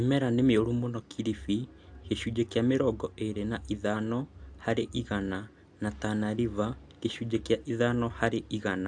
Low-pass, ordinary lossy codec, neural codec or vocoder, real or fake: 9.9 kHz; AAC, 64 kbps; none; real